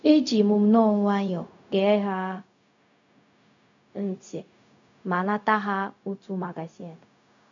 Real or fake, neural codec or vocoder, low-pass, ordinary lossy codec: fake; codec, 16 kHz, 0.4 kbps, LongCat-Audio-Codec; 7.2 kHz; none